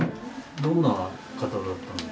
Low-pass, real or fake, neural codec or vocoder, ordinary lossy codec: none; real; none; none